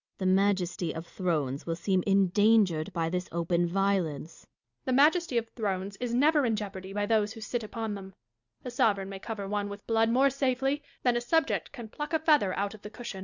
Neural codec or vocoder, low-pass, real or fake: none; 7.2 kHz; real